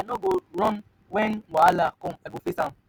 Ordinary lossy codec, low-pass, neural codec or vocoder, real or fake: none; none; none; real